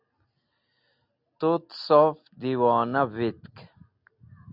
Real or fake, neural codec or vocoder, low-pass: real; none; 5.4 kHz